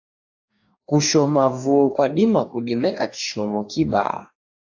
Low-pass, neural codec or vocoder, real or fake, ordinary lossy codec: 7.2 kHz; codec, 44.1 kHz, 2.6 kbps, DAC; fake; AAC, 48 kbps